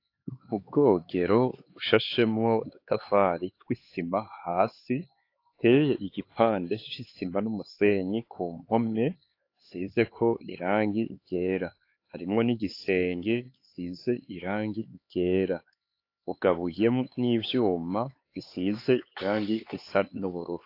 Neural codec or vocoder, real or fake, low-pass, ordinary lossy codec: codec, 16 kHz, 4 kbps, X-Codec, HuBERT features, trained on LibriSpeech; fake; 5.4 kHz; AAC, 32 kbps